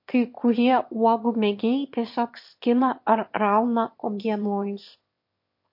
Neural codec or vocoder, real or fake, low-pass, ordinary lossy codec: autoencoder, 22.05 kHz, a latent of 192 numbers a frame, VITS, trained on one speaker; fake; 5.4 kHz; MP3, 32 kbps